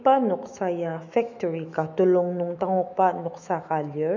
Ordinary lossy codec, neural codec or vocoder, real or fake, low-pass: MP3, 64 kbps; autoencoder, 48 kHz, 128 numbers a frame, DAC-VAE, trained on Japanese speech; fake; 7.2 kHz